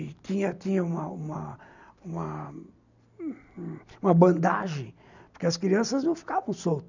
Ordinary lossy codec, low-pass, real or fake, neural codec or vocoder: none; 7.2 kHz; real; none